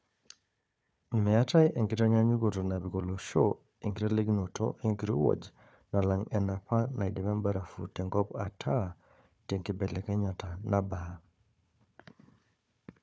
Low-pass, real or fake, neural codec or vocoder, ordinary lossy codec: none; fake; codec, 16 kHz, 4 kbps, FunCodec, trained on Chinese and English, 50 frames a second; none